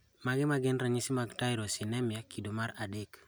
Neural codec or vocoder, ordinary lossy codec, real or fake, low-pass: none; none; real; none